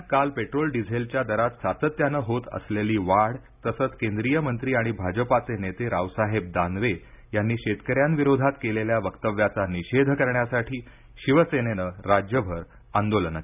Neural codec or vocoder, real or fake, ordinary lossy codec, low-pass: none; real; none; 3.6 kHz